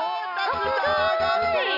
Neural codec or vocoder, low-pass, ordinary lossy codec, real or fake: none; 5.4 kHz; none; real